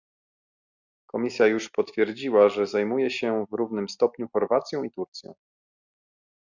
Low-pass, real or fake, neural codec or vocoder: 7.2 kHz; real; none